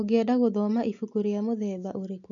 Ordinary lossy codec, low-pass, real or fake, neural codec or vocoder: none; 7.2 kHz; real; none